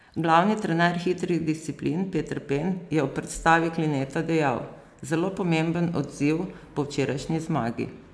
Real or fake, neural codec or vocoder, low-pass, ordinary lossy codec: real; none; none; none